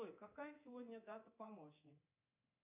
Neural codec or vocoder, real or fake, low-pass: codec, 24 kHz, 3.1 kbps, DualCodec; fake; 3.6 kHz